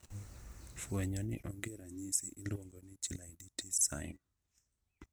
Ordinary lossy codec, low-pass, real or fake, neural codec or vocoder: none; none; real; none